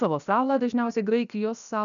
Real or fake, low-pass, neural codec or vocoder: fake; 7.2 kHz; codec, 16 kHz, 0.7 kbps, FocalCodec